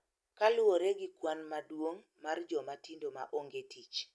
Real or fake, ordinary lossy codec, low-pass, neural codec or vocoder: real; none; none; none